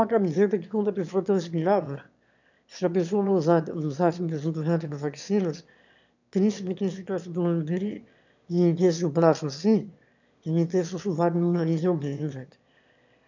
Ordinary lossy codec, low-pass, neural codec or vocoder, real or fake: none; 7.2 kHz; autoencoder, 22.05 kHz, a latent of 192 numbers a frame, VITS, trained on one speaker; fake